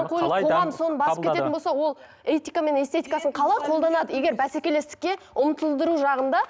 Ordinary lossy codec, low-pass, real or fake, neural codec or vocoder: none; none; real; none